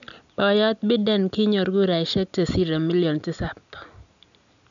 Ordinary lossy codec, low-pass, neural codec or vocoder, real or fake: none; 7.2 kHz; none; real